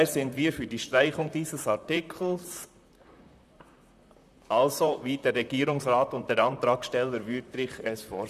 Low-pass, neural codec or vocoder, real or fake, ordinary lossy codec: 14.4 kHz; vocoder, 44.1 kHz, 128 mel bands, Pupu-Vocoder; fake; none